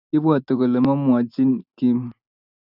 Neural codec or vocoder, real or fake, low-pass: none; real; 5.4 kHz